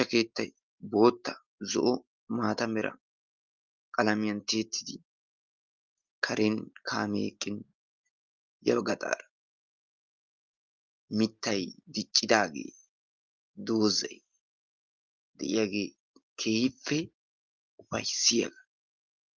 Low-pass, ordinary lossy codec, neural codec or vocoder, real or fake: 7.2 kHz; Opus, 32 kbps; none; real